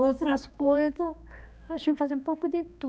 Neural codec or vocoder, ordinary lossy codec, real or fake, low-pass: codec, 16 kHz, 2 kbps, X-Codec, HuBERT features, trained on balanced general audio; none; fake; none